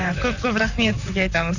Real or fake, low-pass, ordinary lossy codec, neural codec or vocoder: fake; 7.2 kHz; none; vocoder, 44.1 kHz, 128 mel bands, Pupu-Vocoder